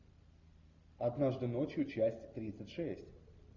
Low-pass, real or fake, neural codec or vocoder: 7.2 kHz; real; none